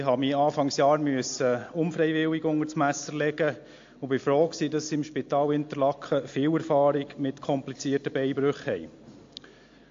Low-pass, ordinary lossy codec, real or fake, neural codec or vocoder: 7.2 kHz; AAC, 48 kbps; real; none